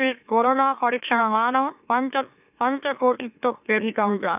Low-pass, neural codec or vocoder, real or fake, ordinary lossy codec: 3.6 kHz; autoencoder, 44.1 kHz, a latent of 192 numbers a frame, MeloTTS; fake; none